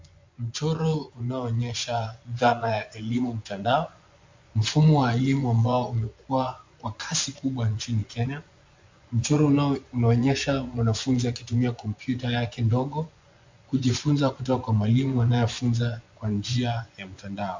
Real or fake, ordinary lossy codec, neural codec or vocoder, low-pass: fake; MP3, 64 kbps; vocoder, 24 kHz, 100 mel bands, Vocos; 7.2 kHz